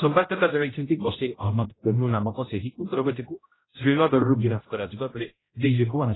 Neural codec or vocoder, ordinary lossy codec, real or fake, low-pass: codec, 16 kHz, 0.5 kbps, X-Codec, HuBERT features, trained on general audio; AAC, 16 kbps; fake; 7.2 kHz